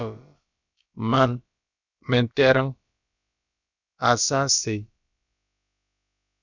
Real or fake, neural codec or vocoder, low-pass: fake; codec, 16 kHz, about 1 kbps, DyCAST, with the encoder's durations; 7.2 kHz